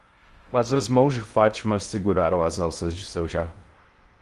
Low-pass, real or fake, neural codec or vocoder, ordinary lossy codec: 10.8 kHz; fake; codec, 16 kHz in and 24 kHz out, 0.6 kbps, FocalCodec, streaming, 2048 codes; Opus, 24 kbps